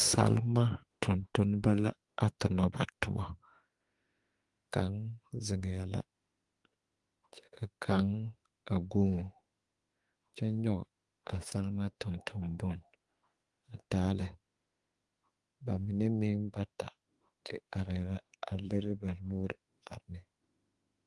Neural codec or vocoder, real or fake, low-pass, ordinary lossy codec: autoencoder, 48 kHz, 32 numbers a frame, DAC-VAE, trained on Japanese speech; fake; 10.8 kHz; Opus, 24 kbps